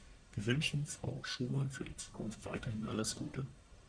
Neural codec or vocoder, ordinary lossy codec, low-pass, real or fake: codec, 44.1 kHz, 1.7 kbps, Pupu-Codec; Opus, 64 kbps; 9.9 kHz; fake